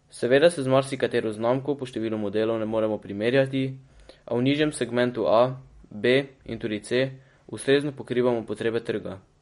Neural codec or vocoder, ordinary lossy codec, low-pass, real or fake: none; MP3, 48 kbps; 10.8 kHz; real